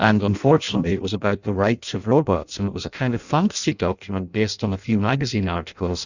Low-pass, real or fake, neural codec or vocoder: 7.2 kHz; fake; codec, 16 kHz in and 24 kHz out, 0.6 kbps, FireRedTTS-2 codec